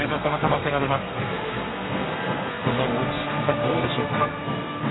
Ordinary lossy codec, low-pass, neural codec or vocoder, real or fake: AAC, 16 kbps; 7.2 kHz; codec, 32 kHz, 1.9 kbps, SNAC; fake